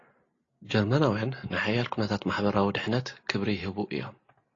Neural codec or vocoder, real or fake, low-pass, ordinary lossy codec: none; real; 7.2 kHz; AAC, 32 kbps